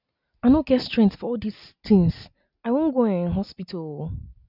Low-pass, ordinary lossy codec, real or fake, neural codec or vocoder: 5.4 kHz; none; real; none